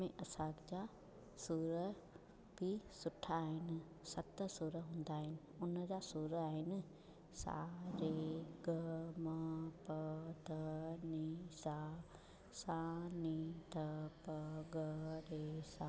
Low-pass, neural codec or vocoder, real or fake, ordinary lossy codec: none; none; real; none